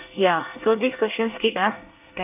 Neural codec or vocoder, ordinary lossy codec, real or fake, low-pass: codec, 24 kHz, 1 kbps, SNAC; none; fake; 3.6 kHz